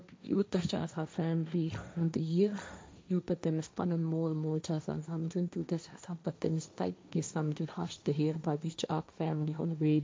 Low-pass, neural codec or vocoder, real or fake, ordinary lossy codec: none; codec, 16 kHz, 1.1 kbps, Voila-Tokenizer; fake; none